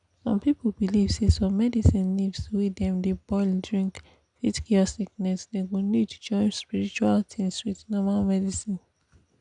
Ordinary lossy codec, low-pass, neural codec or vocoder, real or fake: none; 9.9 kHz; none; real